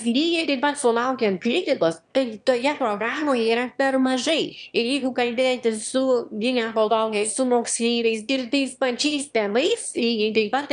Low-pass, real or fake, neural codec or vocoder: 9.9 kHz; fake; autoencoder, 22.05 kHz, a latent of 192 numbers a frame, VITS, trained on one speaker